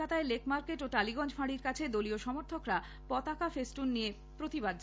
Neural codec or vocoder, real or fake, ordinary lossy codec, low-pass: none; real; none; none